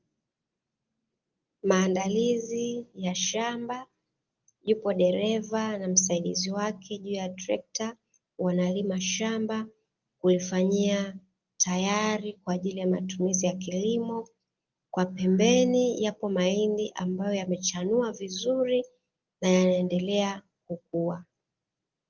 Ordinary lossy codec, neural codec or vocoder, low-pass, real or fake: Opus, 32 kbps; none; 7.2 kHz; real